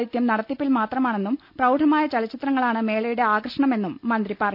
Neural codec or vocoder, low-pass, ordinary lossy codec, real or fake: none; 5.4 kHz; none; real